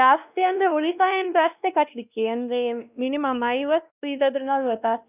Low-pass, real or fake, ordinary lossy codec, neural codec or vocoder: 3.6 kHz; fake; none; codec, 16 kHz, 1 kbps, X-Codec, WavLM features, trained on Multilingual LibriSpeech